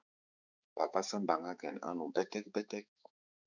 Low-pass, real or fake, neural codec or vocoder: 7.2 kHz; fake; codec, 16 kHz, 4 kbps, X-Codec, HuBERT features, trained on balanced general audio